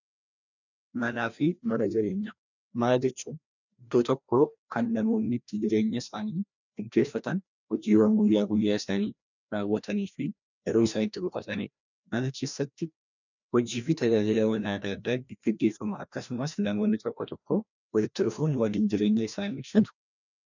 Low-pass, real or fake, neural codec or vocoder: 7.2 kHz; fake; codec, 16 kHz, 1 kbps, FreqCodec, larger model